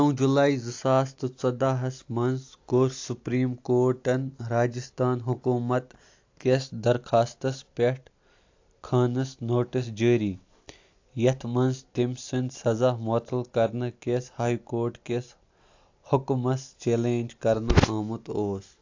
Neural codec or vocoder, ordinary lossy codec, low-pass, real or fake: none; AAC, 48 kbps; 7.2 kHz; real